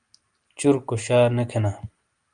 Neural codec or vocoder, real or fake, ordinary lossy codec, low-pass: none; real; Opus, 32 kbps; 9.9 kHz